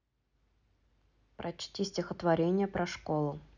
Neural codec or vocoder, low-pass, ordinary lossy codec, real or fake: none; 7.2 kHz; none; real